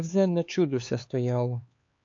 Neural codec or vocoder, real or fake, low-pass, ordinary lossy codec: codec, 16 kHz, 4 kbps, X-Codec, HuBERT features, trained on LibriSpeech; fake; 7.2 kHz; AAC, 48 kbps